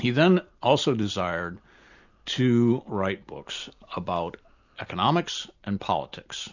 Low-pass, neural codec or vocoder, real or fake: 7.2 kHz; none; real